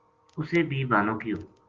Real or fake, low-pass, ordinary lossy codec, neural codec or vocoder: real; 7.2 kHz; Opus, 16 kbps; none